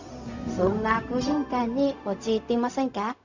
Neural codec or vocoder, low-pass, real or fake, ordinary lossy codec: codec, 16 kHz, 0.4 kbps, LongCat-Audio-Codec; 7.2 kHz; fake; none